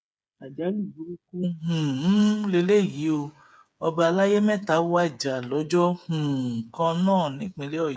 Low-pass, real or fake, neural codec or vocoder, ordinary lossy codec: none; fake; codec, 16 kHz, 8 kbps, FreqCodec, smaller model; none